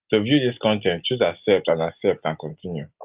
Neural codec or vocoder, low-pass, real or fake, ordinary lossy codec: none; 3.6 kHz; real; Opus, 32 kbps